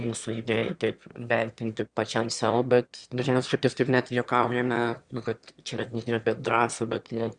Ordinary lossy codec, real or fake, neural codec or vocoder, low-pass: Opus, 64 kbps; fake; autoencoder, 22.05 kHz, a latent of 192 numbers a frame, VITS, trained on one speaker; 9.9 kHz